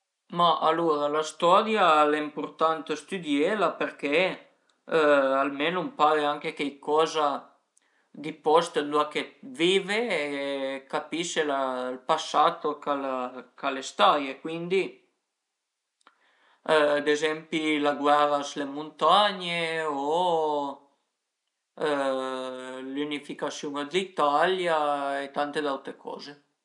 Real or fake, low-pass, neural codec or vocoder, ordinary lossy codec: real; 10.8 kHz; none; none